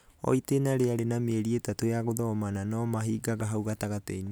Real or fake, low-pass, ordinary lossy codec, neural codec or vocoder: real; none; none; none